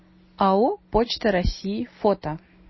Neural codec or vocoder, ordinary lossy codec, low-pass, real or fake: none; MP3, 24 kbps; 7.2 kHz; real